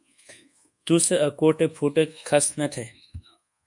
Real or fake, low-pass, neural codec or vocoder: fake; 10.8 kHz; codec, 24 kHz, 1.2 kbps, DualCodec